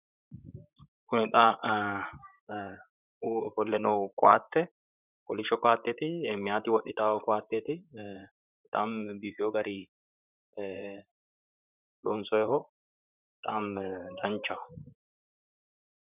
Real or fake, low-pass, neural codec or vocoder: fake; 3.6 kHz; vocoder, 44.1 kHz, 128 mel bands, Pupu-Vocoder